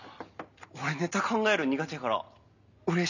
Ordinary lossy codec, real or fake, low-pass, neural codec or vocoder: none; real; 7.2 kHz; none